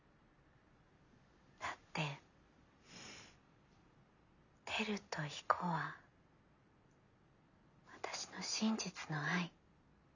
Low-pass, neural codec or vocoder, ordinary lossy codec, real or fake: 7.2 kHz; none; none; real